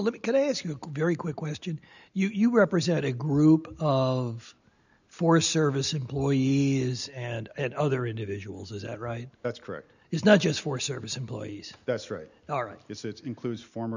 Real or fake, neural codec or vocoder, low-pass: real; none; 7.2 kHz